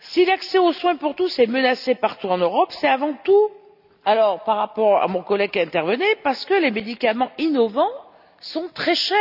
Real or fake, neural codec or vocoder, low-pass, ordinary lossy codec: real; none; 5.4 kHz; none